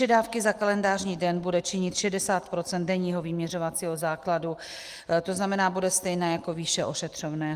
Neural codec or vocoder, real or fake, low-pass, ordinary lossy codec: none; real; 14.4 kHz; Opus, 24 kbps